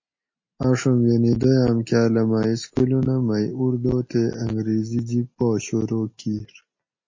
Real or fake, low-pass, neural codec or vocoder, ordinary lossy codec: real; 7.2 kHz; none; MP3, 32 kbps